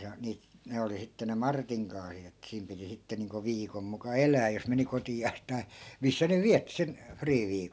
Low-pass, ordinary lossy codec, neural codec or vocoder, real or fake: none; none; none; real